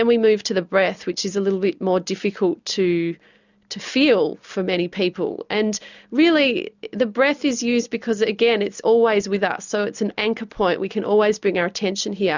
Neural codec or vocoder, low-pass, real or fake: none; 7.2 kHz; real